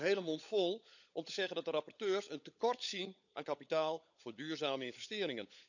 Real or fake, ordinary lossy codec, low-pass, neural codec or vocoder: fake; none; 7.2 kHz; codec, 16 kHz, 16 kbps, FunCodec, trained on LibriTTS, 50 frames a second